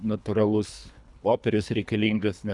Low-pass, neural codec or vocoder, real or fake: 10.8 kHz; codec, 24 kHz, 3 kbps, HILCodec; fake